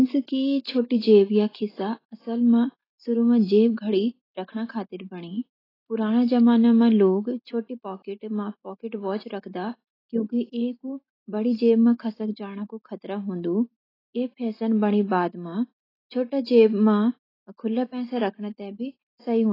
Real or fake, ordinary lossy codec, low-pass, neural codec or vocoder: real; AAC, 24 kbps; 5.4 kHz; none